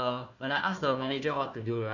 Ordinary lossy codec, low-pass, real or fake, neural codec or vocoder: none; 7.2 kHz; fake; codec, 16 kHz, 1 kbps, FunCodec, trained on Chinese and English, 50 frames a second